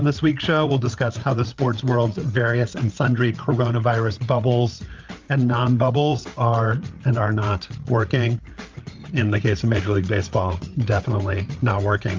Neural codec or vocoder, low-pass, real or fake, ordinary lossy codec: codec, 16 kHz, 8 kbps, FreqCodec, larger model; 7.2 kHz; fake; Opus, 24 kbps